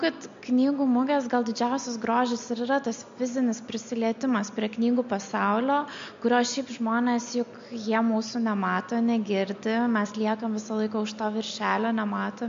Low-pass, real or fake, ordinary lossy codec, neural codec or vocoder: 7.2 kHz; real; MP3, 48 kbps; none